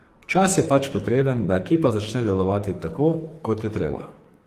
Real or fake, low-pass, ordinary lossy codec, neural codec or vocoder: fake; 14.4 kHz; Opus, 24 kbps; codec, 44.1 kHz, 2.6 kbps, SNAC